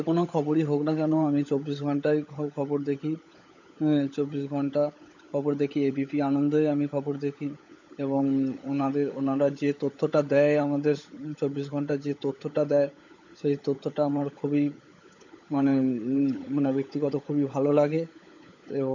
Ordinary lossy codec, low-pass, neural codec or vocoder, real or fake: none; 7.2 kHz; codec, 16 kHz, 16 kbps, FunCodec, trained on LibriTTS, 50 frames a second; fake